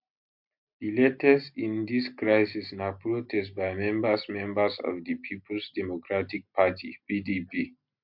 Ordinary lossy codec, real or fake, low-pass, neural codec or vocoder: MP3, 48 kbps; real; 5.4 kHz; none